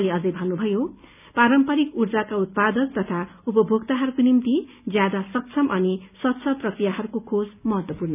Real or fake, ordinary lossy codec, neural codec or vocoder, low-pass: real; none; none; 3.6 kHz